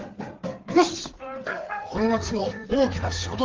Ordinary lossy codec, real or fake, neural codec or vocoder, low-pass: Opus, 16 kbps; fake; codec, 16 kHz, 4 kbps, FreqCodec, larger model; 7.2 kHz